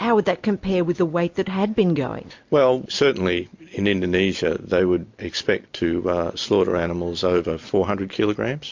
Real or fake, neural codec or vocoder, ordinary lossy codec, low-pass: real; none; MP3, 48 kbps; 7.2 kHz